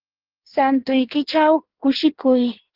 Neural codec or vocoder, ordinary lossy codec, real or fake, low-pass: codec, 16 kHz in and 24 kHz out, 1.1 kbps, FireRedTTS-2 codec; Opus, 16 kbps; fake; 5.4 kHz